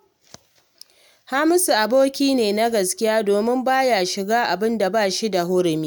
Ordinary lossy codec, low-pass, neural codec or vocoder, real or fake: none; none; none; real